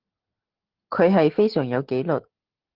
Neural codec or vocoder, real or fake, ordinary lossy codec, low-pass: none; real; Opus, 16 kbps; 5.4 kHz